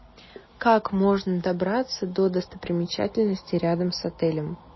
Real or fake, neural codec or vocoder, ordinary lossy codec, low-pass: real; none; MP3, 24 kbps; 7.2 kHz